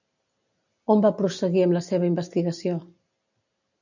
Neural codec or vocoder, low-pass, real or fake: none; 7.2 kHz; real